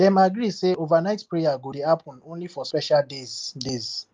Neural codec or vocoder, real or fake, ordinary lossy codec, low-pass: none; real; Opus, 32 kbps; 7.2 kHz